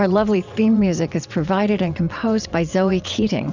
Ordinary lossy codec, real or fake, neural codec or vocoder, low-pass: Opus, 64 kbps; fake; vocoder, 22.05 kHz, 80 mel bands, WaveNeXt; 7.2 kHz